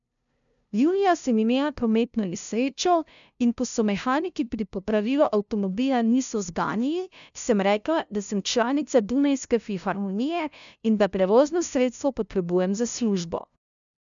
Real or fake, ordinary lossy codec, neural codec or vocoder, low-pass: fake; none; codec, 16 kHz, 0.5 kbps, FunCodec, trained on LibriTTS, 25 frames a second; 7.2 kHz